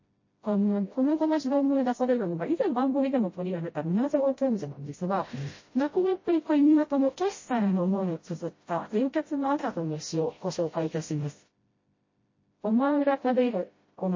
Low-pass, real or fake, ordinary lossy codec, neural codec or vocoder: 7.2 kHz; fake; MP3, 32 kbps; codec, 16 kHz, 0.5 kbps, FreqCodec, smaller model